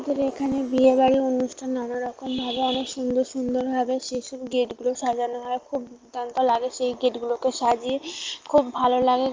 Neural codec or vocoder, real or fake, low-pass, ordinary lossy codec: none; real; 7.2 kHz; Opus, 24 kbps